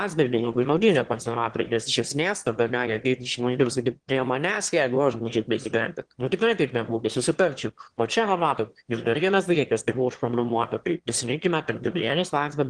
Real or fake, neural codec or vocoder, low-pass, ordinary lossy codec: fake; autoencoder, 22.05 kHz, a latent of 192 numbers a frame, VITS, trained on one speaker; 9.9 kHz; Opus, 16 kbps